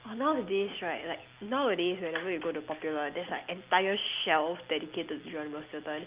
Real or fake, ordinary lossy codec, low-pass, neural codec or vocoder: real; Opus, 24 kbps; 3.6 kHz; none